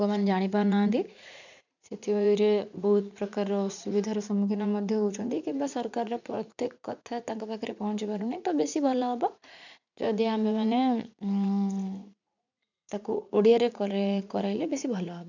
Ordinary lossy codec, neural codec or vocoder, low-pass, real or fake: none; vocoder, 44.1 kHz, 80 mel bands, Vocos; 7.2 kHz; fake